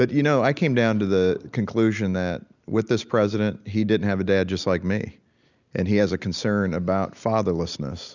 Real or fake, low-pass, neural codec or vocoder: real; 7.2 kHz; none